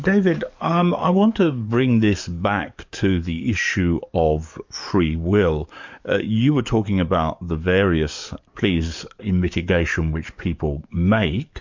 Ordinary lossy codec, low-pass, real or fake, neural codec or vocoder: MP3, 64 kbps; 7.2 kHz; fake; codec, 44.1 kHz, 7.8 kbps, DAC